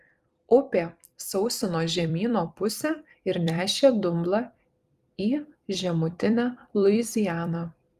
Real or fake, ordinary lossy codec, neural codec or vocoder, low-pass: fake; Opus, 32 kbps; vocoder, 44.1 kHz, 128 mel bands every 256 samples, BigVGAN v2; 14.4 kHz